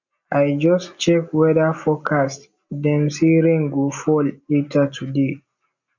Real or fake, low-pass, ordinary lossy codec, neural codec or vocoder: real; 7.2 kHz; none; none